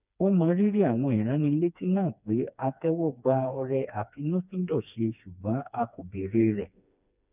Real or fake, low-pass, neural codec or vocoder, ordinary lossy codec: fake; 3.6 kHz; codec, 16 kHz, 2 kbps, FreqCodec, smaller model; none